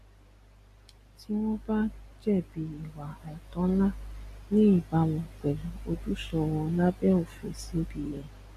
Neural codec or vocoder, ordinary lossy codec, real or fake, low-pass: none; MP3, 64 kbps; real; 14.4 kHz